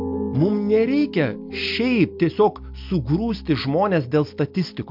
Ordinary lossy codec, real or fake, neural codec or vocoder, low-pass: MP3, 48 kbps; real; none; 5.4 kHz